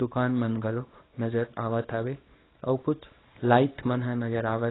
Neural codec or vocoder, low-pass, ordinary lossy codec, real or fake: codec, 24 kHz, 0.9 kbps, WavTokenizer, small release; 7.2 kHz; AAC, 16 kbps; fake